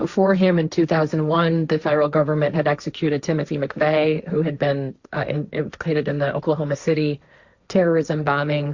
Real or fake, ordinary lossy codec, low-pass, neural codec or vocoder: fake; Opus, 64 kbps; 7.2 kHz; codec, 16 kHz, 1.1 kbps, Voila-Tokenizer